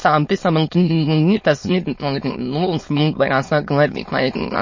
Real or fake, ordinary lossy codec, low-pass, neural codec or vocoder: fake; MP3, 32 kbps; 7.2 kHz; autoencoder, 22.05 kHz, a latent of 192 numbers a frame, VITS, trained on many speakers